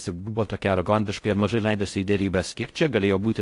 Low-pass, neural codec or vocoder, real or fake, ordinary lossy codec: 10.8 kHz; codec, 16 kHz in and 24 kHz out, 0.6 kbps, FocalCodec, streaming, 4096 codes; fake; AAC, 48 kbps